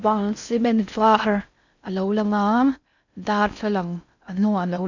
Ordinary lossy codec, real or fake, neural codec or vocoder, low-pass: none; fake; codec, 16 kHz in and 24 kHz out, 0.6 kbps, FocalCodec, streaming, 4096 codes; 7.2 kHz